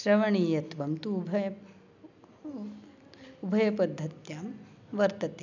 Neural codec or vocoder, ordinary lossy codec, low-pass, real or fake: none; none; 7.2 kHz; real